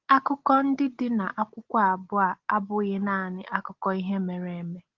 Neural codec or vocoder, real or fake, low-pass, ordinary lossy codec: none; real; 7.2 kHz; Opus, 16 kbps